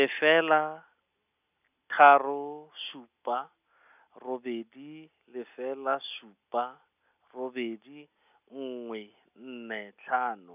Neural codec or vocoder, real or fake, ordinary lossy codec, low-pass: none; real; none; 3.6 kHz